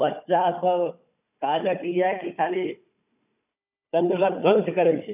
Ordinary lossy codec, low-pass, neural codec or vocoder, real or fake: none; 3.6 kHz; codec, 16 kHz, 4 kbps, FunCodec, trained on Chinese and English, 50 frames a second; fake